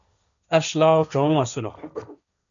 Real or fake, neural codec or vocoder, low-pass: fake; codec, 16 kHz, 1.1 kbps, Voila-Tokenizer; 7.2 kHz